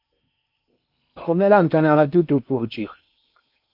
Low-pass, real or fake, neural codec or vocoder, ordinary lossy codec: 5.4 kHz; fake; codec, 16 kHz in and 24 kHz out, 0.8 kbps, FocalCodec, streaming, 65536 codes; MP3, 48 kbps